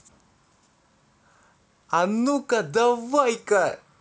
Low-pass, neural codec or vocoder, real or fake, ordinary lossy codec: none; none; real; none